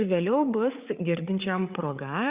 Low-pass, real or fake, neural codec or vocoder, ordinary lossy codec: 3.6 kHz; fake; codec, 16 kHz, 8 kbps, FreqCodec, larger model; Opus, 64 kbps